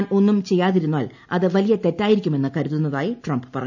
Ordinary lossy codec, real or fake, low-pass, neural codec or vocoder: none; real; 7.2 kHz; none